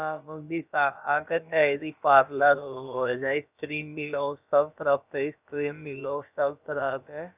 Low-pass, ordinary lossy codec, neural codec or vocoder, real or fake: 3.6 kHz; none; codec, 16 kHz, about 1 kbps, DyCAST, with the encoder's durations; fake